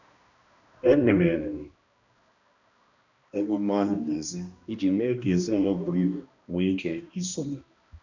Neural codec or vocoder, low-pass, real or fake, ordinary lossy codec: codec, 16 kHz, 1 kbps, X-Codec, HuBERT features, trained on balanced general audio; 7.2 kHz; fake; none